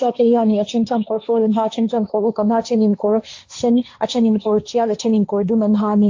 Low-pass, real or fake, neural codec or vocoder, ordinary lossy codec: 7.2 kHz; fake; codec, 16 kHz, 1.1 kbps, Voila-Tokenizer; AAC, 48 kbps